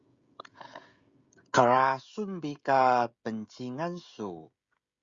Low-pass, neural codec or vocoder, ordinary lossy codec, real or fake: 7.2 kHz; codec, 16 kHz, 16 kbps, FreqCodec, smaller model; Opus, 64 kbps; fake